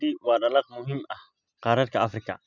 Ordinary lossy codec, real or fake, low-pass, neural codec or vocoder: none; real; 7.2 kHz; none